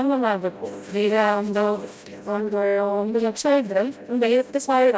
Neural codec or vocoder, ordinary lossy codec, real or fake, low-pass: codec, 16 kHz, 0.5 kbps, FreqCodec, smaller model; none; fake; none